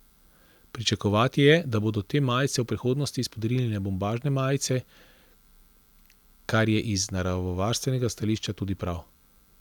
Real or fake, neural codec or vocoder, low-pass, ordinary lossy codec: real; none; 19.8 kHz; none